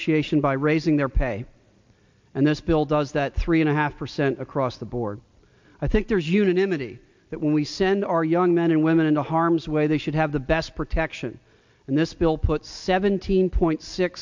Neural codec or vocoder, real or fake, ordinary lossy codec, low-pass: none; real; MP3, 64 kbps; 7.2 kHz